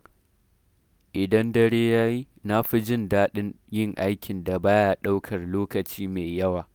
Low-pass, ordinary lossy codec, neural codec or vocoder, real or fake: none; none; none; real